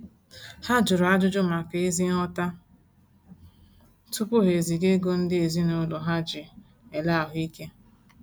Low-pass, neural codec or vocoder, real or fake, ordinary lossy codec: none; none; real; none